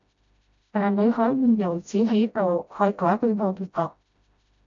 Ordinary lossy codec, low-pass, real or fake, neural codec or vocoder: MP3, 64 kbps; 7.2 kHz; fake; codec, 16 kHz, 0.5 kbps, FreqCodec, smaller model